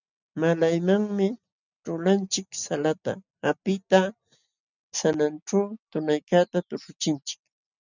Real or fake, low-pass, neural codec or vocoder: real; 7.2 kHz; none